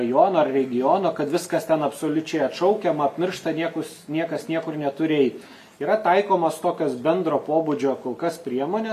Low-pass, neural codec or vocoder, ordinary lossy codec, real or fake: 14.4 kHz; none; AAC, 96 kbps; real